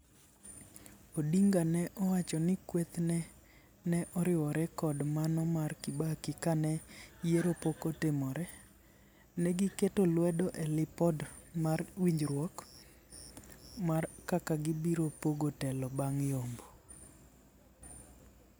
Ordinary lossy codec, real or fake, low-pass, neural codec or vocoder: none; real; none; none